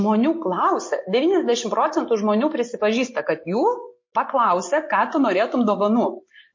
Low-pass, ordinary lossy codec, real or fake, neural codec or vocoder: 7.2 kHz; MP3, 32 kbps; real; none